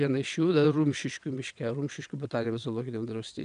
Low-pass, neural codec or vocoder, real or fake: 9.9 kHz; vocoder, 22.05 kHz, 80 mel bands, WaveNeXt; fake